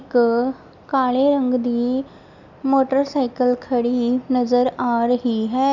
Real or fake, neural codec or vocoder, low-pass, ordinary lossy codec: real; none; 7.2 kHz; none